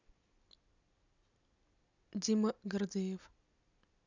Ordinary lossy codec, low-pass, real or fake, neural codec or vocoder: none; 7.2 kHz; real; none